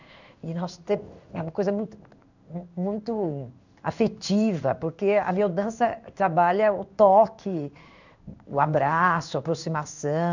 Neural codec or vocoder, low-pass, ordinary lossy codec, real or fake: codec, 16 kHz in and 24 kHz out, 1 kbps, XY-Tokenizer; 7.2 kHz; none; fake